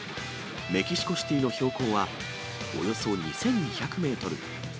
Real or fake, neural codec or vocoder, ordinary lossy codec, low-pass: real; none; none; none